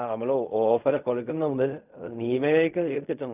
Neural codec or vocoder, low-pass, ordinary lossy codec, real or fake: codec, 16 kHz in and 24 kHz out, 0.4 kbps, LongCat-Audio-Codec, fine tuned four codebook decoder; 3.6 kHz; none; fake